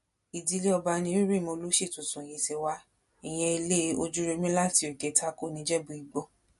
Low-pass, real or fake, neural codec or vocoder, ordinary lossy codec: 14.4 kHz; real; none; MP3, 48 kbps